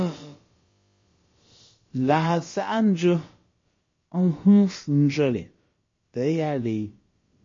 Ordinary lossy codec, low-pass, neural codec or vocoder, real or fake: MP3, 32 kbps; 7.2 kHz; codec, 16 kHz, about 1 kbps, DyCAST, with the encoder's durations; fake